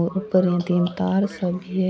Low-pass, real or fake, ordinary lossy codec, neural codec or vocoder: none; real; none; none